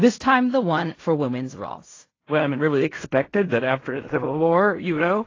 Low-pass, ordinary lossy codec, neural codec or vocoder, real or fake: 7.2 kHz; AAC, 32 kbps; codec, 16 kHz in and 24 kHz out, 0.4 kbps, LongCat-Audio-Codec, fine tuned four codebook decoder; fake